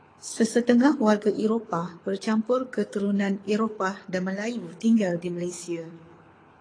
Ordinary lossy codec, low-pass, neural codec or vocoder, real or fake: AAC, 32 kbps; 9.9 kHz; codec, 24 kHz, 6 kbps, HILCodec; fake